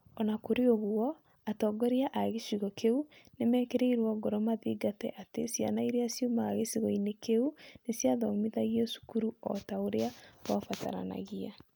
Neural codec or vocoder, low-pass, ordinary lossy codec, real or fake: none; none; none; real